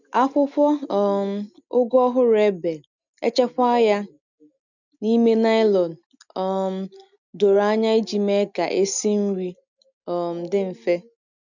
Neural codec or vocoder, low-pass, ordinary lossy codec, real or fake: none; 7.2 kHz; none; real